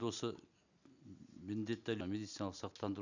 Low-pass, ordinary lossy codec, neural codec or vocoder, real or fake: 7.2 kHz; none; none; real